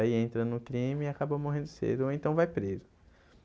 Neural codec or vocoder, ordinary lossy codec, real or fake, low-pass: none; none; real; none